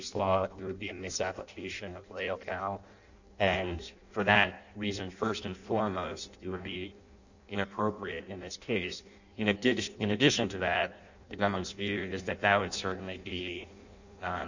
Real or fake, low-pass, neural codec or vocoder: fake; 7.2 kHz; codec, 16 kHz in and 24 kHz out, 0.6 kbps, FireRedTTS-2 codec